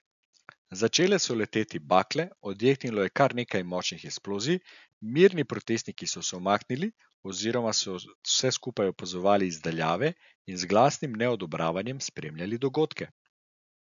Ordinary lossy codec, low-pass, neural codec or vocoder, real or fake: none; 7.2 kHz; none; real